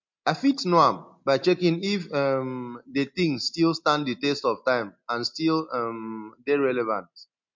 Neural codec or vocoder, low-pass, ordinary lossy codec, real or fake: none; 7.2 kHz; MP3, 48 kbps; real